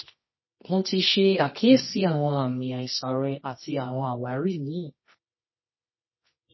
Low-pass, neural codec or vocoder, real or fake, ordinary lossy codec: 7.2 kHz; codec, 24 kHz, 0.9 kbps, WavTokenizer, medium music audio release; fake; MP3, 24 kbps